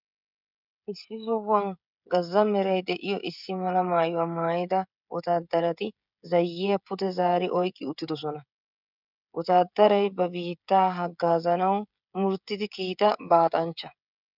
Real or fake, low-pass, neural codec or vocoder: fake; 5.4 kHz; codec, 16 kHz, 16 kbps, FreqCodec, smaller model